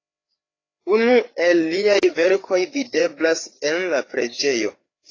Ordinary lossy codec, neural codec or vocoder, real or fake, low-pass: AAC, 32 kbps; codec, 16 kHz, 4 kbps, FreqCodec, larger model; fake; 7.2 kHz